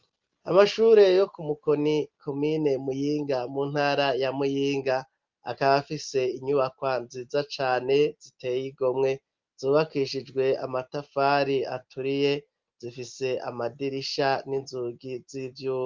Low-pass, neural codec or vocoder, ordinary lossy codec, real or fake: 7.2 kHz; none; Opus, 32 kbps; real